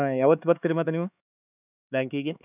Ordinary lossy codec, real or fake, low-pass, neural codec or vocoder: none; fake; 3.6 kHz; codec, 16 kHz, 4 kbps, X-Codec, HuBERT features, trained on LibriSpeech